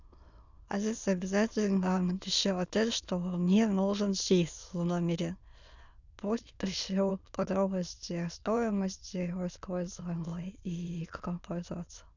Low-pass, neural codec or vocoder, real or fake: 7.2 kHz; autoencoder, 22.05 kHz, a latent of 192 numbers a frame, VITS, trained on many speakers; fake